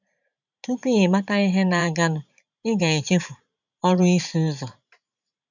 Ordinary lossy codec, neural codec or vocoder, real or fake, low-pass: none; vocoder, 44.1 kHz, 128 mel bands every 512 samples, BigVGAN v2; fake; 7.2 kHz